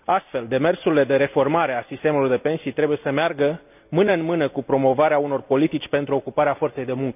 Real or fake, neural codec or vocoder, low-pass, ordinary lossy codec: real; none; 3.6 kHz; none